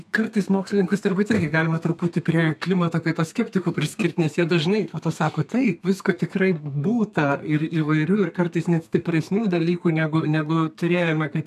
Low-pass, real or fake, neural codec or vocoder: 14.4 kHz; fake; codec, 32 kHz, 1.9 kbps, SNAC